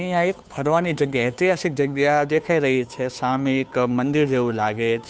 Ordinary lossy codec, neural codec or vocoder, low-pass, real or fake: none; codec, 16 kHz, 2 kbps, FunCodec, trained on Chinese and English, 25 frames a second; none; fake